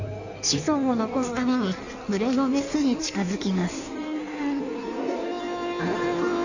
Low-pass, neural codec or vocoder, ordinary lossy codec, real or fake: 7.2 kHz; codec, 16 kHz in and 24 kHz out, 1.1 kbps, FireRedTTS-2 codec; none; fake